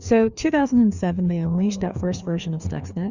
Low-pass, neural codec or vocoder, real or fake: 7.2 kHz; codec, 16 kHz, 2 kbps, FreqCodec, larger model; fake